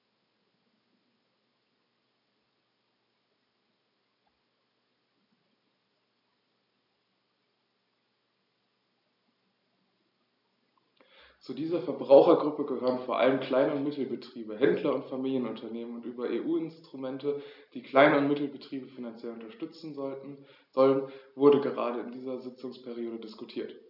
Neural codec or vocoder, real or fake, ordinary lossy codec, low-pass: none; real; none; 5.4 kHz